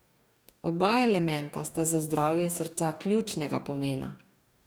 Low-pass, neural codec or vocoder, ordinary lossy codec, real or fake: none; codec, 44.1 kHz, 2.6 kbps, DAC; none; fake